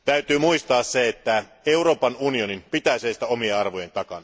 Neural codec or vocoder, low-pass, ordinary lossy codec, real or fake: none; none; none; real